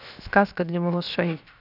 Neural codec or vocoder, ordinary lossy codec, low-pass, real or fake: codec, 16 kHz, 0.7 kbps, FocalCodec; none; 5.4 kHz; fake